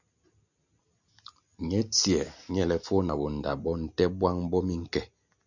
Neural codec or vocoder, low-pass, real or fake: none; 7.2 kHz; real